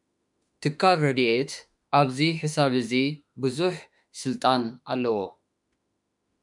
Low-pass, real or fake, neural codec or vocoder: 10.8 kHz; fake; autoencoder, 48 kHz, 32 numbers a frame, DAC-VAE, trained on Japanese speech